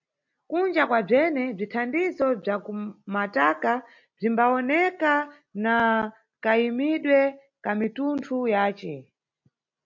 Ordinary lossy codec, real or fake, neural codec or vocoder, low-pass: MP3, 48 kbps; real; none; 7.2 kHz